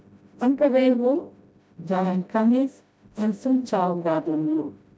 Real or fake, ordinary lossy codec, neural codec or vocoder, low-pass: fake; none; codec, 16 kHz, 0.5 kbps, FreqCodec, smaller model; none